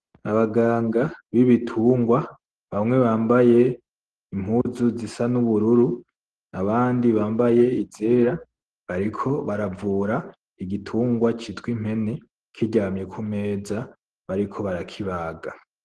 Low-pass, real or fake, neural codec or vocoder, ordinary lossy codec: 10.8 kHz; real; none; Opus, 16 kbps